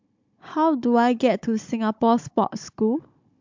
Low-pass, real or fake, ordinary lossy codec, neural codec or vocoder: 7.2 kHz; fake; MP3, 64 kbps; codec, 16 kHz, 16 kbps, FunCodec, trained on Chinese and English, 50 frames a second